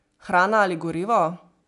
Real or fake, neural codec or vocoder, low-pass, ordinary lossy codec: real; none; 10.8 kHz; AAC, 96 kbps